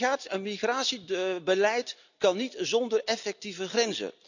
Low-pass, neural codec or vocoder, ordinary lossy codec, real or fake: 7.2 kHz; none; none; real